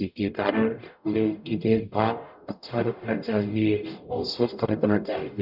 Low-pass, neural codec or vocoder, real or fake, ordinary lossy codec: 5.4 kHz; codec, 44.1 kHz, 0.9 kbps, DAC; fake; none